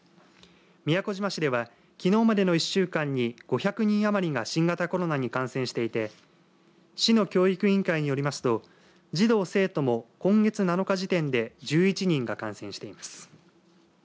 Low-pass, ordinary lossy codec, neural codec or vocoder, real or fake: none; none; none; real